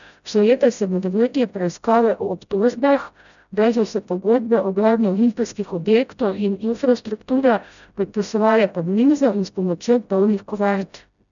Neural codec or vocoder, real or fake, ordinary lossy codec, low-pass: codec, 16 kHz, 0.5 kbps, FreqCodec, smaller model; fake; none; 7.2 kHz